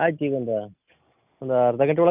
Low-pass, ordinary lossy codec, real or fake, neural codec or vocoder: 3.6 kHz; none; real; none